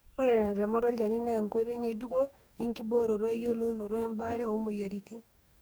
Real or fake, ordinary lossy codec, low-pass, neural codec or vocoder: fake; none; none; codec, 44.1 kHz, 2.6 kbps, DAC